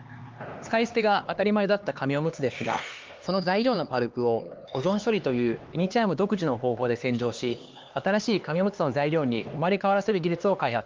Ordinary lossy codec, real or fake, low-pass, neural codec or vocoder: Opus, 32 kbps; fake; 7.2 kHz; codec, 16 kHz, 2 kbps, X-Codec, HuBERT features, trained on LibriSpeech